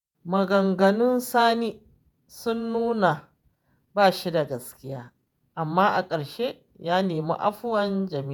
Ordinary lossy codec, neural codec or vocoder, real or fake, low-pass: none; vocoder, 48 kHz, 128 mel bands, Vocos; fake; none